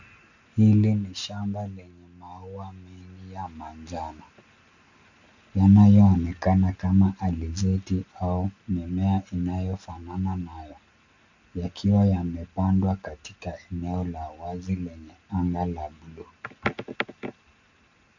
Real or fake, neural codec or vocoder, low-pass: real; none; 7.2 kHz